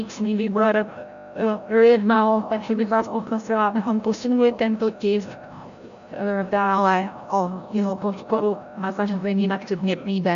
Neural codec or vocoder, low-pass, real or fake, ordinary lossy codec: codec, 16 kHz, 0.5 kbps, FreqCodec, larger model; 7.2 kHz; fake; AAC, 96 kbps